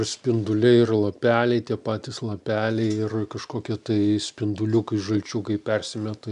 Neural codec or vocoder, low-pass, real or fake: none; 10.8 kHz; real